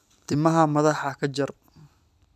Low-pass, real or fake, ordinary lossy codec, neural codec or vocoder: 14.4 kHz; fake; none; vocoder, 44.1 kHz, 128 mel bands every 256 samples, BigVGAN v2